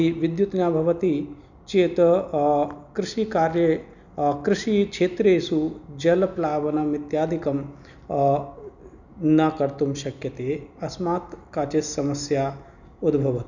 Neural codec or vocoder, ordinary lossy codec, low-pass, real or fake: none; none; 7.2 kHz; real